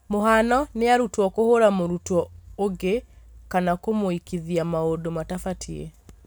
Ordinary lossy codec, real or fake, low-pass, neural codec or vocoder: none; real; none; none